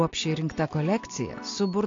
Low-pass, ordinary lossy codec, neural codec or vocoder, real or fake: 7.2 kHz; AAC, 48 kbps; none; real